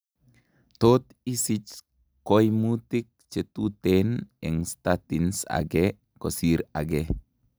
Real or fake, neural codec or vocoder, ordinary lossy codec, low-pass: real; none; none; none